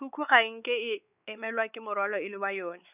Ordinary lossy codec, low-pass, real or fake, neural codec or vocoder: none; 3.6 kHz; fake; codec, 16 kHz, 4 kbps, X-Codec, WavLM features, trained on Multilingual LibriSpeech